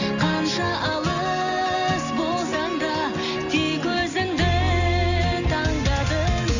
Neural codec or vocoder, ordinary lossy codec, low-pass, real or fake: none; none; 7.2 kHz; real